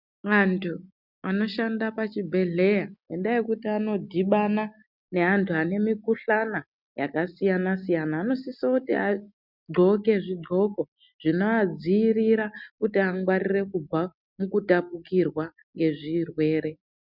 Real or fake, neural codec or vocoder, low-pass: real; none; 5.4 kHz